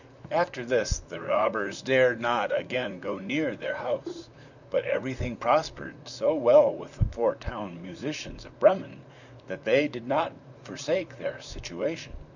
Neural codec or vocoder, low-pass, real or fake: vocoder, 44.1 kHz, 128 mel bands, Pupu-Vocoder; 7.2 kHz; fake